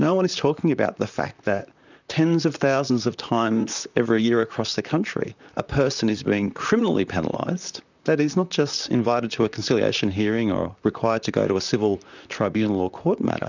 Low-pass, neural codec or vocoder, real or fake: 7.2 kHz; vocoder, 44.1 kHz, 128 mel bands, Pupu-Vocoder; fake